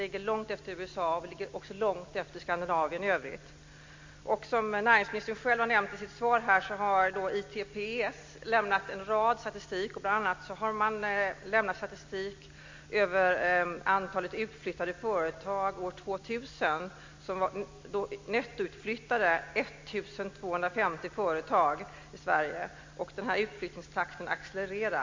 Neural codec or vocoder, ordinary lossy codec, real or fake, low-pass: none; MP3, 48 kbps; real; 7.2 kHz